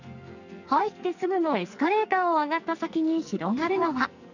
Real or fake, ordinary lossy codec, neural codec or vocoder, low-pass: fake; none; codec, 44.1 kHz, 2.6 kbps, SNAC; 7.2 kHz